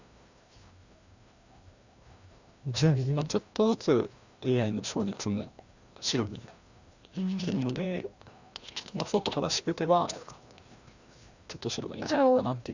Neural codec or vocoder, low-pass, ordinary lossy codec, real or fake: codec, 16 kHz, 1 kbps, FreqCodec, larger model; 7.2 kHz; Opus, 64 kbps; fake